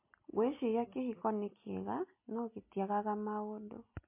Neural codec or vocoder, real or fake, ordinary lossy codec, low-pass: none; real; MP3, 24 kbps; 3.6 kHz